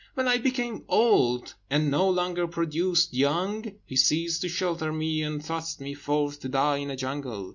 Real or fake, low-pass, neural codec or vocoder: real; 7.2 kHz; none